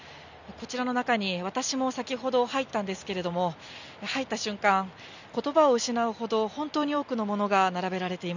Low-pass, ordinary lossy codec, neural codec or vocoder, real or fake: 7.2 kHz; none; none; real